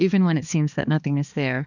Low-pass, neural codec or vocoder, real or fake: 7.2 kHz; codec, 16 kHz, 2 kbps, X-Codec, HuBERT features, trained on balanced general audio; fake